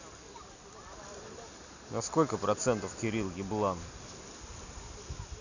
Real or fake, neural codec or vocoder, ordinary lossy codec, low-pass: real; none; none; 7.2 kHz